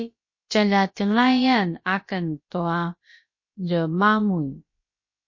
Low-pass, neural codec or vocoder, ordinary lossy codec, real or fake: 7.2 kHz; codec, 16 kHz, about 1 kbps, DyCAST, with the encoder's durations; MP3, 32 kbps; fake